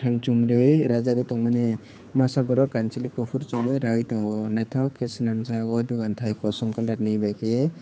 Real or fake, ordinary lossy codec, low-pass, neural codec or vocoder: fake; none; none; codec, 16 kHz, 4 kbps, X-Codec, HuBERT features, trained on general audio